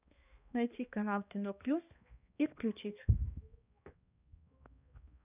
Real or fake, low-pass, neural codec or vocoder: fake; 3.6 kHz; codec, 16 kHz, 1 kbps, X-Codec, HuBERT features, trained on balanced general audio